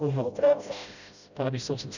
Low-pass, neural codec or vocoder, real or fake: 7.2 kHz; codec, 16 kHz, 0.5 kbps, FreqCodec, smaller model; fake